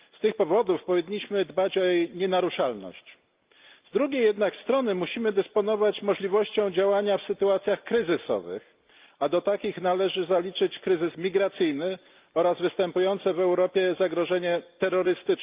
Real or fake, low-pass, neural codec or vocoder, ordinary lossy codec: real; 3.6 kHz; none; Opus, 32 kbps